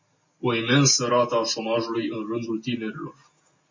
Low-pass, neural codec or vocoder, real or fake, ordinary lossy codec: 7.2 kHz; none; real; MP3, 32 kbps